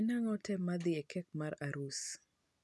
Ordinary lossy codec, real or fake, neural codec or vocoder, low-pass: none; real; none; none